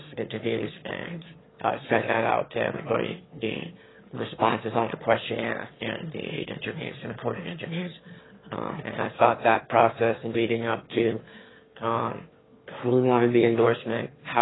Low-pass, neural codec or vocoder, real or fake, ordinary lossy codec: 7.2 kHz; autoencoder, 22.05 kHz, a latent of 192 numbers a frame, VITS, trained on one speaker; fake; AAC, 16 kbps